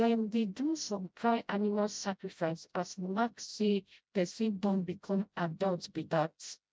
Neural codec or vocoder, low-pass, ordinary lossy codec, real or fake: codec, 16 kHz, 0.5 kbps, FreqCodec, smaller model; none; none; fake